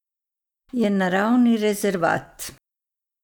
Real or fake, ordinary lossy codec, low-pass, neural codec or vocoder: real; none; 19.8 kHz; none